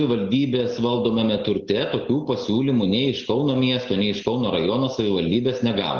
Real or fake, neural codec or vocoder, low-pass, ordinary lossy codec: real; none; 7.2 kHz; Opus, 16 kbps